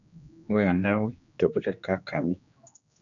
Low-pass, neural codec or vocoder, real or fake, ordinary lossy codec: 7.2 kHz; codec, 16 kHz, 2 kbps, X-Codec, HuBERT features, trained on general audio; fake; MP3, 96 kbps